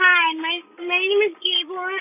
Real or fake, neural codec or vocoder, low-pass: fake; codec, 16 kHz, 16 kbps, FreqCodec, larger model; 3.6 kHz